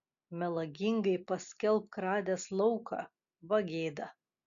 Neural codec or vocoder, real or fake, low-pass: none; real; 7.2 kHz